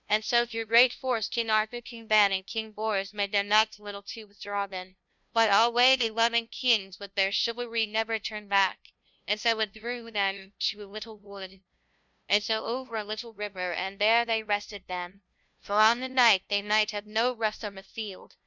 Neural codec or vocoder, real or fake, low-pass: codec, 16 kHz, 0.5 kbps, FunCodec, trained on LibriTTS, 25 frames a second; fake; 7.2 kHz